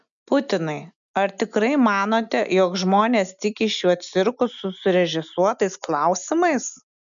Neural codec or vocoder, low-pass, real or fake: none; 7.2 kHz; real